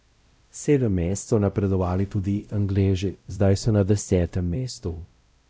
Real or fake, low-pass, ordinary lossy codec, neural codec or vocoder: fake; none; none; codec, 16 kHz, 0.5 kbps, X-Codec, WavLM features, trained on Multilingual LibriSpeech